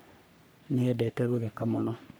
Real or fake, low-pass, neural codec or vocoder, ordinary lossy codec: fake; none; codec, 44.1 kHz, 3.4 kbps, Pupu-Codec; none